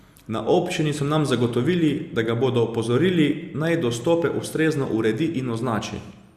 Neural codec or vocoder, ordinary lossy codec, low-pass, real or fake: none; Opus, 64 kbps; 14.4 kHz; real